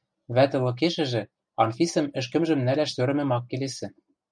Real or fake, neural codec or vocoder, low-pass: real; none; 9.9 kHz